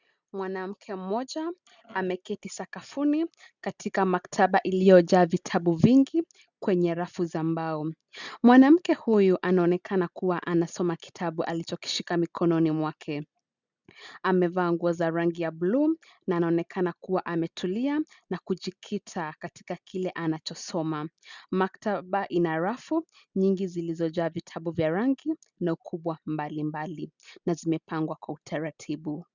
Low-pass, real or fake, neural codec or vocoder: 7.2 kHz; real; none